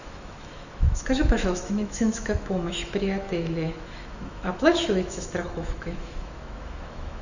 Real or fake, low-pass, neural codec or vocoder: fake; 7.2 kHz; autoencoder, 48 kHz, 128 numbers a frame, DAC-VAE, trained on Japanese speech